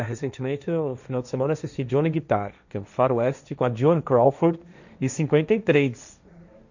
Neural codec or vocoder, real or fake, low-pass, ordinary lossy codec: codec, 16 kHz, 1.1 kbps, Voila-Tokenizer; fake; 7.2 kHz; none